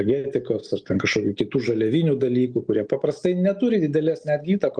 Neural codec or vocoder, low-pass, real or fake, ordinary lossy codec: none; 9.9 kHz; real; Opus, 24 kbps